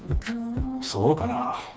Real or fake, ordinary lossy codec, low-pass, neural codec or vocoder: fake; none; none; codec, 16 kHz, 2 kbps, FreqCodec, smaller model